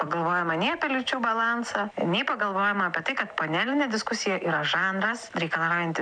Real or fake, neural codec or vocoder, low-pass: real; none; 9.9 kHz